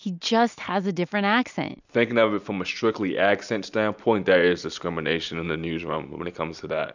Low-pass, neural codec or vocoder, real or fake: 7.2 kHz; none; real